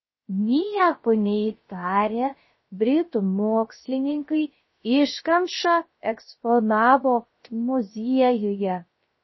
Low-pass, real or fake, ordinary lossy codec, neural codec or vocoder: 7.2 kHz; fake; MP3, 24 kbps; codec, 16 kHz, 0.3 kbps, FocalCodec